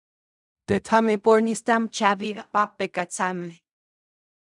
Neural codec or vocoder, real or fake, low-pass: codec, 16 kHz in and 24 kHz out, 0.4 kbps, LongCat-Audio-Codec, fine tuned four codebook decoder; fake; 10.8 kHz